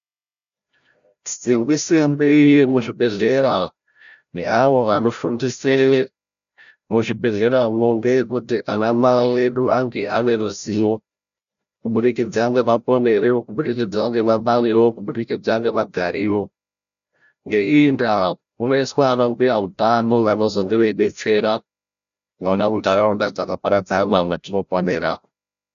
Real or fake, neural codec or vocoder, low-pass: fake; codec, 16 kHz, 0.5 kbps, FreqCodec, larger model; 7.2 kHz